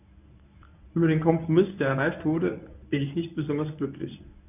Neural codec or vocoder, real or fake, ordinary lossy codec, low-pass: codec, 24 kHz, 0.9 kbps, WavTokenizer, medium speech release version 1; fake; none; 3.6 kHz